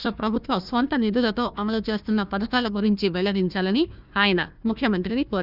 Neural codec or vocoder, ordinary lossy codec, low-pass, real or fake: codec, 16 kHz, 1 kbps, FunCodec, trained on Chinese and English, 50 frames a second; none; 5.4 kHz; fake